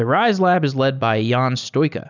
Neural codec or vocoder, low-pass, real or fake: none; 7.2 kHz; real